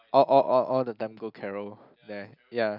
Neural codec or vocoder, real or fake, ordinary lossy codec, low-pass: none; real; none; 5.4 kHz